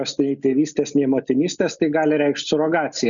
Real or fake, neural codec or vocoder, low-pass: real; none; 7.2 kHz